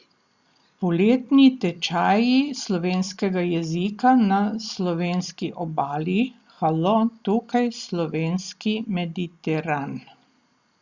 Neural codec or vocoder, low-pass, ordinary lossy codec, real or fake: none; 7.2 kHz; Opus, 64 kbps; real